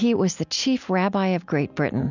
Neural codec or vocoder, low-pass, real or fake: none; 7.2 kHz; real